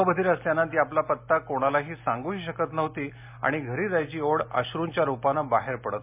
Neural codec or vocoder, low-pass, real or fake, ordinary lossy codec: none; 3.6 kHz; real; MP3, 32 kbps